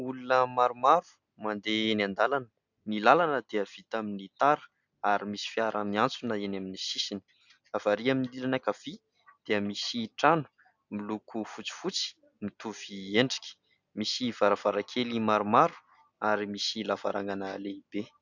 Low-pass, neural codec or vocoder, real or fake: 7.2 kHz; none; real